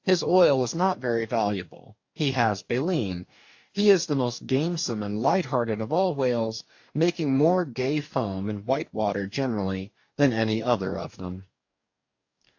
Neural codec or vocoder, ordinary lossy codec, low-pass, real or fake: codec, 44.1 kHz, 2.6 kbps, DAC; AAC, 48 kbps; 7.2 kHz; fake